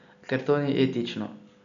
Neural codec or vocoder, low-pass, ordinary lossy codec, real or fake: none; 7.2 kHz; none; real